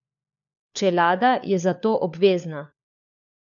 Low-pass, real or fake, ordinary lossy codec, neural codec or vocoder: 7.2 kHz; fake; none; codec, 16 kHz, 4 kbps, FunCodec, trained on LibriTTS, 50 frames a second